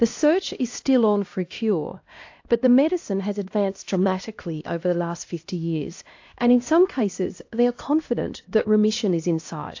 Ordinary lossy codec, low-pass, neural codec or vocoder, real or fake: AAC, 48 kbps; 7.2 kHz; codec, 16 kHz, 1 kbps, X-Codec, HuBERT features, trained on LibriSpeech; fake